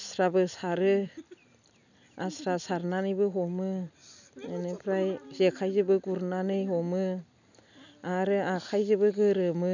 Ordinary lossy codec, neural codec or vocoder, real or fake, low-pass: none; none; real; 7.2 kHz